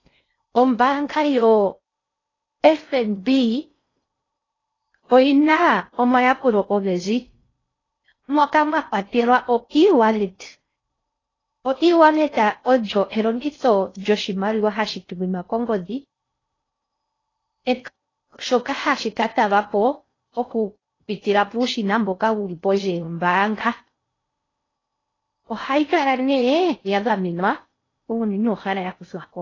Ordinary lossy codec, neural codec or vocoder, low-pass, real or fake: AAC, 32 kbps; codec, 16 kHz in and 24 kHz out, 0.6 kbps, FocalCodec, streaming, 4096 codes; 7.2 kHz; fake